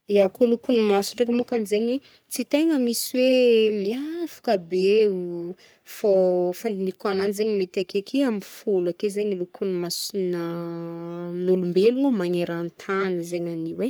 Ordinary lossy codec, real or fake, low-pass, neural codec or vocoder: none; fake; none; codec, 44.1 kHz, 3.4 kbps, Pupu-Codec